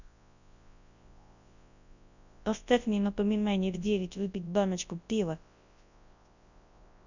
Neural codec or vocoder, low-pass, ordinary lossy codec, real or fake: codec, 24 kHz, 0.9 kbps, WavTokenizer, large speech release; 7.2 kHz; none; fake